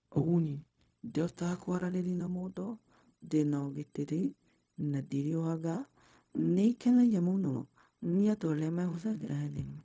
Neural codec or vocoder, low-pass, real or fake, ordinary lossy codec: codec, 16 kHz, 0.4 kbps, LongCat-Audio-Codec; none; fake; none